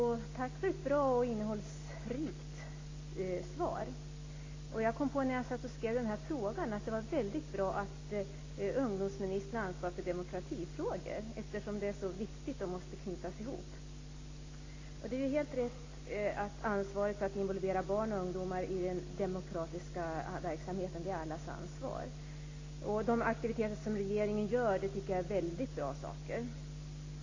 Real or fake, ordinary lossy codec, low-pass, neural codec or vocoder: real; AAC, 32 kbps; 7.2 kHz; none